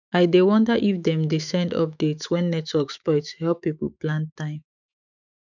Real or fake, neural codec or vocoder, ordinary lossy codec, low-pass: fake; autoencoder, 48 kHz, 128 numbers a frame, DAC-VAE, trained on Japanese speech; none; 7.2 kHz